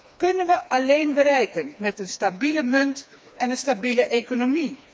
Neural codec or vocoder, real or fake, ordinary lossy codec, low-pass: codec, 16 kHz, 2 kbps, FreqCodec, smaller model; fake; none; none